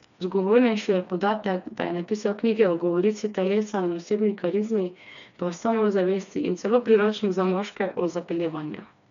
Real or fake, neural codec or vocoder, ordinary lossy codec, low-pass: fake; codec, 16 kHz, 2 kbps, FreqCodec, smaller model; none; 7.2 kHz